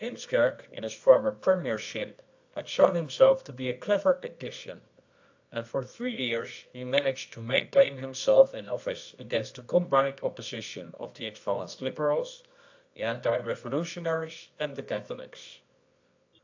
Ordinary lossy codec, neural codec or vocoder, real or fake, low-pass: AAC, 48 kbps; codec, 24 kHz, 0.9 kbps, WavTokenizer, medium music audio release; fake; 7.2 kHz